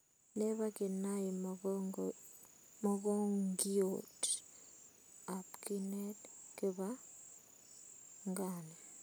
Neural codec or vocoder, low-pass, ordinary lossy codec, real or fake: none; none; none; real